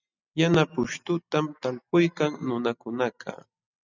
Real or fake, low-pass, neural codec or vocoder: real; 7.2 kHz; none